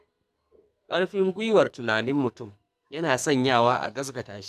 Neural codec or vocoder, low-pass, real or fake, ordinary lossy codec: codec, 44.1 kHz, 2.6 kbps, SNAC; 14.4 kHz; fake; none